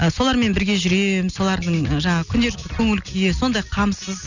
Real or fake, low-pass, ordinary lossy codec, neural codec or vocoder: real; 7.2 kHz; none; none